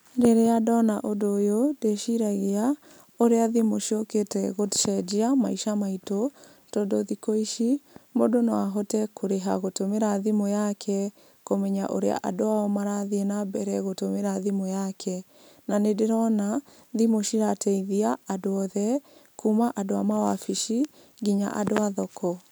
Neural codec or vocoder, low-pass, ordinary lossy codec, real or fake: none; none; none; real